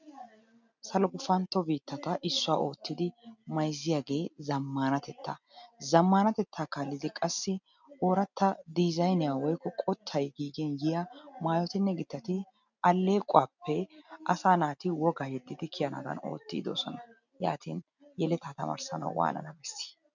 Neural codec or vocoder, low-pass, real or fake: none; 7.2 kHz; real